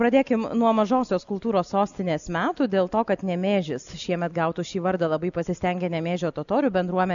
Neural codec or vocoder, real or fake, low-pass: none; real; 7.2 kHz